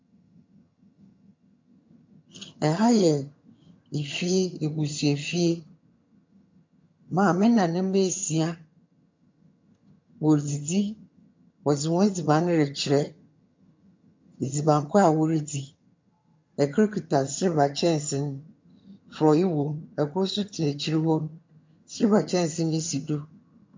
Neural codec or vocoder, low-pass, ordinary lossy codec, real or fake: vocoder, 22.05 kHz, 80 mel bands, HiFi-GAN; 7.2 kHz; MP3, 48 kbps; fake